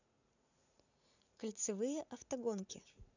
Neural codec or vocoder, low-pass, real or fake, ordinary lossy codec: vocoder, 44.1 kHz, 80 mel bands, Vocos; 7.2 kHz; fake; none